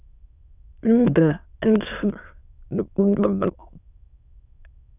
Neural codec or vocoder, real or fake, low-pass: autoencoder, 22.05 kHz, a latent of 192 numbers a frame, VITS, trained on many speakers; fake; 3.6 kHz